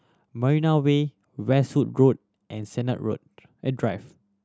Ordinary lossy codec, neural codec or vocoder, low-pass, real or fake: none; none; none; real